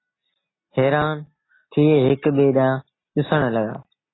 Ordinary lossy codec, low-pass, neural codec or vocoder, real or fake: AAC, 16 kbps; 7.2 kHz; none; real